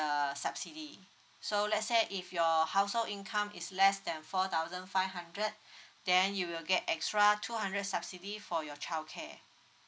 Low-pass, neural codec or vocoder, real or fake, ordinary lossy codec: none; none; real; none